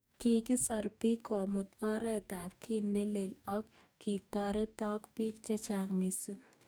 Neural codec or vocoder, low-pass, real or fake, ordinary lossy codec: codec, 44.1 kHz, 2.6 kbps, DAC; none; fake; none